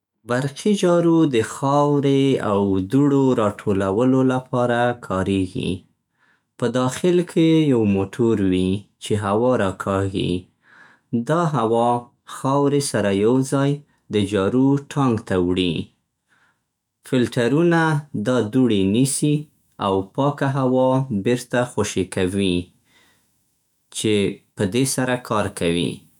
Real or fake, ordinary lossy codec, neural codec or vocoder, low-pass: fake; none; autoencoder, 48 kHz, 128 numbers a frame, DAC-VAE, trained on Japanese speech; 19.8 kHz